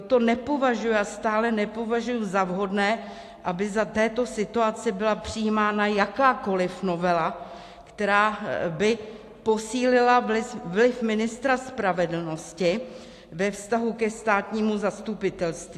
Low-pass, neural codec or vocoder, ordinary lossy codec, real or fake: 14.4 kHz; none; AAC, 64 kbps; real